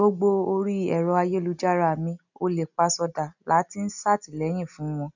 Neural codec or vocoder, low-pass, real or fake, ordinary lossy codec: none; 7.2 kHz; real; none